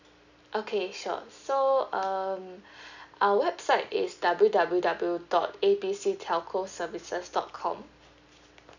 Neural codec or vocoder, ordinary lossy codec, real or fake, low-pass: none; none; real; 7.2 kHz